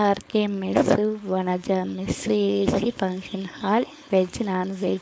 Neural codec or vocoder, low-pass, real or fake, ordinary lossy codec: codec, 16 kHz, 4.8 kbps, FACodec; none; fake; none